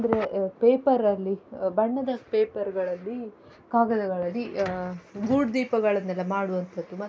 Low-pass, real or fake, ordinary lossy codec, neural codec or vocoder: none; real; none; none